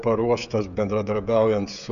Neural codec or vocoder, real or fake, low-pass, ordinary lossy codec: codec, 16 kHz, 16 kbps, FreqCodec, smaller model; fake; 7.2 kHz; AAC, 96 kbps